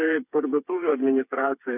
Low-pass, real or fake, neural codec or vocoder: 3.6 kHz; fake; codec, 16 kHz, 4 kbps, FreqCodec, smaller model